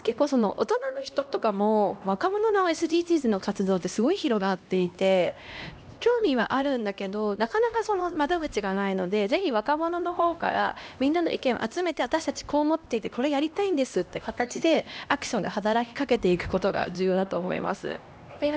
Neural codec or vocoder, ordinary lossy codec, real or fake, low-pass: codec, 16 kHz, 1 kbps, X-Codec, HuBERT features, trained on LibriSpeech; none; fake; none